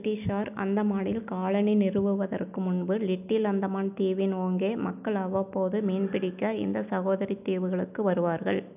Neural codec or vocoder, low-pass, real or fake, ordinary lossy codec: none; 3.6 kHz; real; none